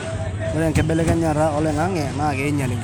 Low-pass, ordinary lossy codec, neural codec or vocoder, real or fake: none; none; none; real